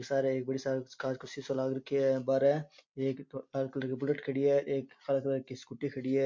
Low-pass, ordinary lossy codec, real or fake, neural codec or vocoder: 7.2 kHz; MP3, 48 kbps; real; none